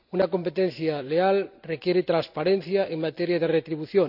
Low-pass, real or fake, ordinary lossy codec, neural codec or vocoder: 5.4 kHz; real; none; none